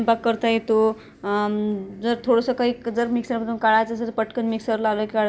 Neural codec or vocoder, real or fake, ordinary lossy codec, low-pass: none; real; none; none